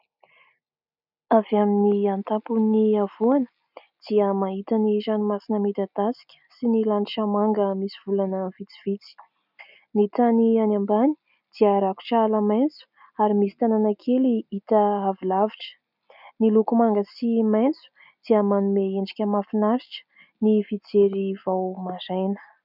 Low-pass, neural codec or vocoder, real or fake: 5.4 kHz; none; real